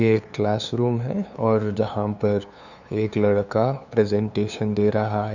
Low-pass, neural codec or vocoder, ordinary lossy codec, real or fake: 7.2 kHz; codec, 16 kHz, 2 kbps, FunCodec, trained on LibriTTS, 25 frames a second; none; fake